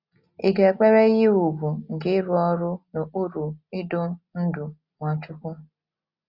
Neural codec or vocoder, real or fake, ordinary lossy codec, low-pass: none; real; Opus, 64 kbps; 5.4 kHz